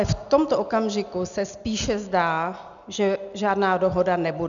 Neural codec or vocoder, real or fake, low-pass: none; real; 7.2 kHz